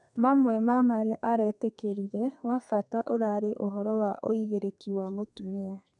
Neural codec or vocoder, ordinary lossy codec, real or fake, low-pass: codec, 32 kHz, 1.9 kbps, SNAC; none; fake; 10.8 kHz